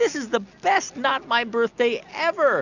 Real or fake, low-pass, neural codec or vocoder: real; 7.2 kHz; none